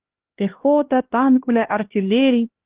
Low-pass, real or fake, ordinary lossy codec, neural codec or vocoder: 3.6 kHz; fake; Opus, 16 kbps; codec, 16 kHz, 1 kbps, X-Codec, HuBERT features, trained on LibriSpeech